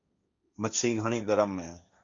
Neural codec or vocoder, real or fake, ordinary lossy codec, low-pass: codec, 16 kHz, 1.1 kbps, Voila-Tokenizer; fake; AAC, 64 kbps; 7.2 kHz